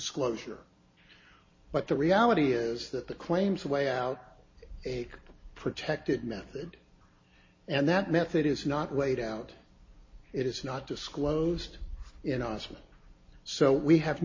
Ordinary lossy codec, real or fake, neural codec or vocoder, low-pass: MP3, 32 kbps; real; none; 7.2 kHz